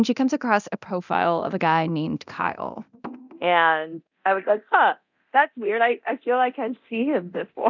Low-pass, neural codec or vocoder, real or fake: 7.2 kHz; codec, 24 kHz, 0.9 kbps, DualCodec; fake